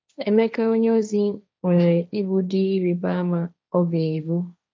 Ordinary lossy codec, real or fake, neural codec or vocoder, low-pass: none; fake; codec, 16 kHz, 1.1 kbps, Voila-Tokenizer; none